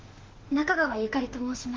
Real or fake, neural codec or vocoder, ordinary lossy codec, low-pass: fake; autoencoder, 48 kHz, 32 numbers a frame, DAC-VAE, trained on Japanese speech; Opus, 16 kbps; 7.2 kHz